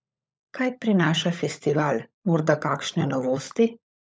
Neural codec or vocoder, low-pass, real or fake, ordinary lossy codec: codec, 16 kHz, 16 kbps, FunCodec, trained on LibriTTS, 50 frames a second; none; fake; none